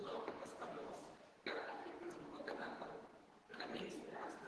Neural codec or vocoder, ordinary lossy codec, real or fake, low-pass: codec, 24 kHz, 0.9 kbps, WavTokenizer, medium speech release version 2; Opus, 16 kbps; fake; 10.8 kHz